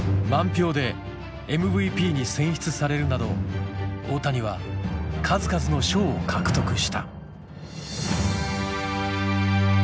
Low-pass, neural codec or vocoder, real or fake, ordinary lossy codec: none; none; real; none